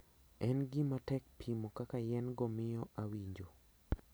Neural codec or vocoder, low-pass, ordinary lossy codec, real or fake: none; none; none; real